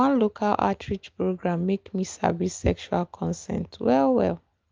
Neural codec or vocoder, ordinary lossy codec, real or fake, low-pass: none; Opus, 24 kbps; real; 7.2 kHz